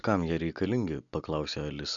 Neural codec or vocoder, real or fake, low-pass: none; real; 7.2 kHz